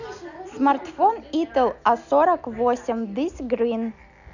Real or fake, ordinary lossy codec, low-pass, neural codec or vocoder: real; none; 7.2 kHz; none